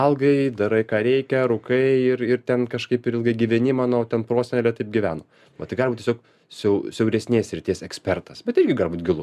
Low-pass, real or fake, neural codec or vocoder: 14.4 kHz; real; none